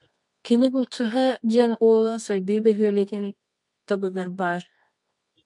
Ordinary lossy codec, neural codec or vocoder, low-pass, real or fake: MP3, 64 kbps; codec, 24 kHz, 0.9 kbps, WavTokenizer, medium music audio release; 10.8 kHz; fake